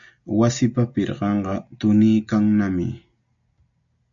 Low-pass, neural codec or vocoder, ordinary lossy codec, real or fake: 7.2 kHz; none; MP3, 64 kbps; real